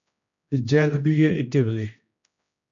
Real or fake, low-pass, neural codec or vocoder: fake; 7.2 kHz; codec, 16 kHz, 1 kbps, X-Codec, HuBERT features, trained on general audio